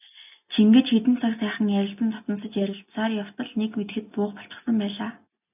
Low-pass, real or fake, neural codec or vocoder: 3.6 kHz; real; none